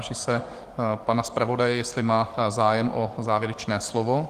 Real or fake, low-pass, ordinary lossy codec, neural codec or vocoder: fake; 14.4 kHz; Opus, 24 kbps; codec, 44.1 kHz, 7.8 kbps, DAC